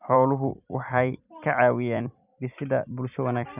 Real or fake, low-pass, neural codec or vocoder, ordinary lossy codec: fake; 3.6 kHz; vocoder, 44.1 kHz, 128 mel bands every 256 samples, BigVGAN v2; none